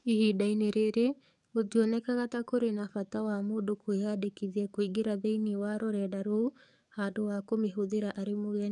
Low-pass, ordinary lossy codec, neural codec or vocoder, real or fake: 10.8 kHz; none; codec, 44.1 kHz, 7.8 kbps, DAC; fake